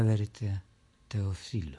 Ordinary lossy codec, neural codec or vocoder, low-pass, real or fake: MP3, 48 kbps; none; 10.8 kHz; real